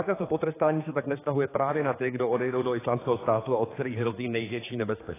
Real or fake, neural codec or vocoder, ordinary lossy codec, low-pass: fake; codec, 16 kHz, 4 kbps, X-Codec, HuBERT features, trained on general audio; AAC, 16 kbps; 3.6 kHz